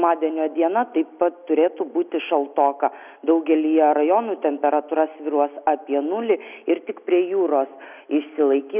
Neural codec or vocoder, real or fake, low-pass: none; real; 3.6 kHz